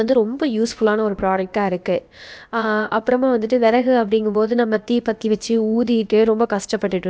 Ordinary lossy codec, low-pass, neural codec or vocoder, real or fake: none; none; codec, 16 kHz, about 1 kbps, DyCAST, with the encoder's durations; fake